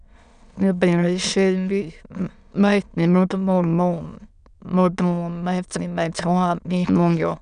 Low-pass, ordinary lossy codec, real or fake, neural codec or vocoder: 9.9 kHz; none; fake; autoencoder, 22.05 kHz, a latent of 192 numbers a frame, VITS, trained on many speakers